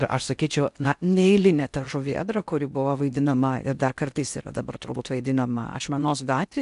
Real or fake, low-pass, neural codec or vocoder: fake; 10.8 kHz; codec, 16 kHz in and 24 kHz out, 0.6 kbps, FocalCodec, streaming, 4096 codes